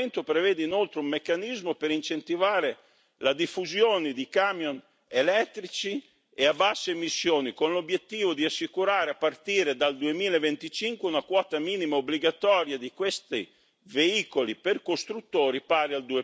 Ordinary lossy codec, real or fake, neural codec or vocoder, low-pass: none; real; none; none